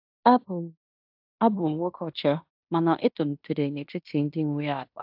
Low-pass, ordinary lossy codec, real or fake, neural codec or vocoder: 5.4 kHz; AAC, 48 kbps; fake; codec, 16 kHz in and 24 kHz out, 0.9 kbps, LongCat-Audio-Codec, fine tuned four codebook decoder